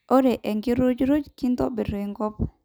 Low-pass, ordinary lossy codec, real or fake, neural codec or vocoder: none; none; real; none